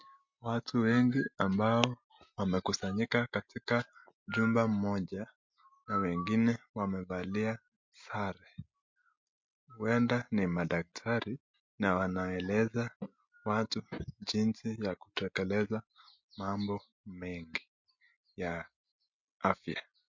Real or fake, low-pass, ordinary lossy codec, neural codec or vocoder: real; 7.2 kHz; MP3, 48 kbps; none